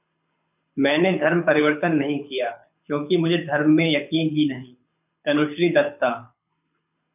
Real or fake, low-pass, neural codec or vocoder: fake; 3.6 kHz; codec, 24 kHz, 6 kbps, HILCodec